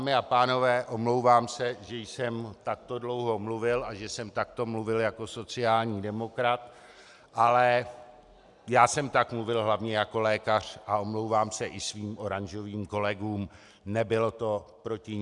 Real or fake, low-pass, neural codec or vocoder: real; 10.8 kHz; none